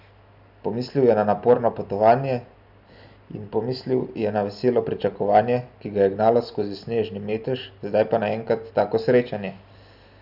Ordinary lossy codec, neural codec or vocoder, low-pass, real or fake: none; none; 5.4 kHz; real